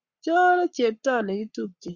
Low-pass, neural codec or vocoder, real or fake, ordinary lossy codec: 7.2 kHz; codec, 44.1 kHz, 7.8 kbps, Pupu-Codec; fake; Opus, 64 kbps